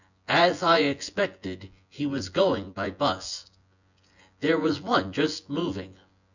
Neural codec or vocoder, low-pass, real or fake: vocoder, 24 kHz, 100 mel bands, Vocos; 7.2 kHz; fake